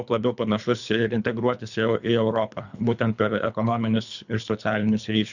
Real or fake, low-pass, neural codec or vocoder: fake; 7.2 kHz; codec, 24 kHz, 3 kbps, HILCodec